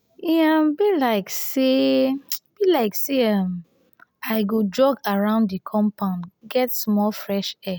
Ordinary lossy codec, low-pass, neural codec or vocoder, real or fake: none; none; none; real